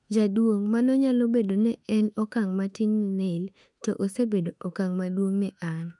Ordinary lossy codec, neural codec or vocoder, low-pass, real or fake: none; autoencoder, 48 kHz, 32 numbers a frame, DAC-VAE, trained on Japanese speech; 10.8 kHz; fake